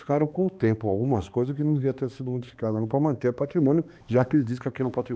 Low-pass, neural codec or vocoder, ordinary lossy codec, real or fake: none; codec, 16 kHz, 2 kbps, X-Codec, HuBERT features, trained on balanced general audio; none; fake